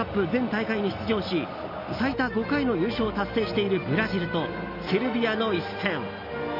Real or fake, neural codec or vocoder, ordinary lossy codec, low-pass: real; none; none; 5.4 kHz